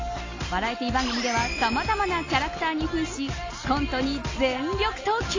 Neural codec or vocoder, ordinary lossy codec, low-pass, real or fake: none; AAC, 32 kbps; 7.2 kHz; real